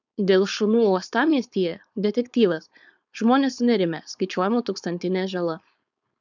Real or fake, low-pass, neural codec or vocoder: fake; 7.2 kHz; codec, 16 kHz, 4.8 kbps, FACodec